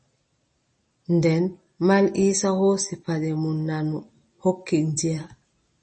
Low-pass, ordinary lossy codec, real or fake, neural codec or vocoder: 10.8 kHz; MP3, 32 kbps; real; none